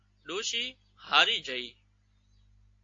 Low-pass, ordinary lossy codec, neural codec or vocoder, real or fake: 7.2 kHz; AAC, 48 kbps; none; real